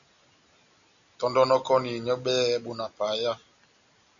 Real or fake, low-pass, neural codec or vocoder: real; 7.2 kHz; none